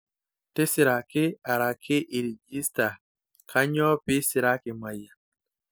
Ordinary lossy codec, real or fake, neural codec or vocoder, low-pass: none; real; none; none